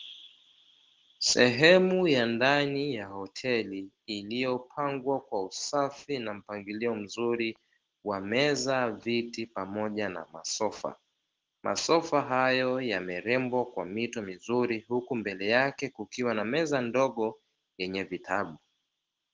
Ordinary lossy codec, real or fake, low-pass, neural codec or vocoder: Opus, 16 kbps; real; 7.2 kHz; none